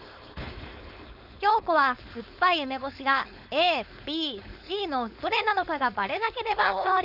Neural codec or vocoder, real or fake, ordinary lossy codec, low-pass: codec, 16 kHz, 4.8 kbps, FACodec; fake; none; 5.4 kHz